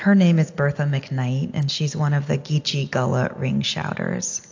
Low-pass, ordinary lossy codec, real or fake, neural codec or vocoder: 7.2 kHz; AAC, 48 kbps; real; none